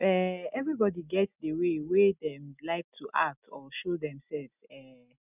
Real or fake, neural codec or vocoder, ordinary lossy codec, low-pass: real; none; none; 3.6 kHz